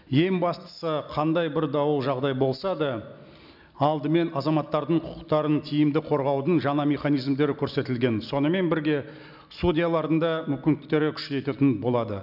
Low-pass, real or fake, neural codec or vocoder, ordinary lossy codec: 5.4 kHz; real; none; none